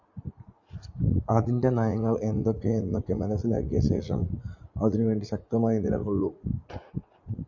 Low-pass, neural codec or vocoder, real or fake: 7.2 kHz; vocoder, 44.1 kHz, 80 mel bands, Vocos; fake